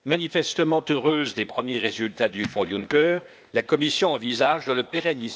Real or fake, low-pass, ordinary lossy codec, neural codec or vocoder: fake; none; none; codec, 16 kHz, 0.8 kbps, ZipCodec